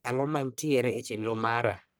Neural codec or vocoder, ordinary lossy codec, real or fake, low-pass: codec, 44.1 kHz, 1.7 kbps, Pupu-Codec; none; fake; none